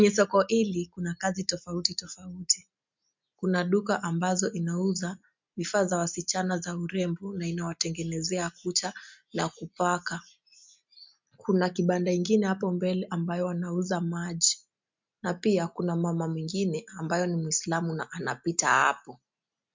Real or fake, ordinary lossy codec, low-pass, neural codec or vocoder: real; MP3, 64 kbps; 7.2 kHz; none